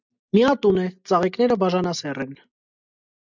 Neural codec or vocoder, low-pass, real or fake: none; 7.2 kHz; real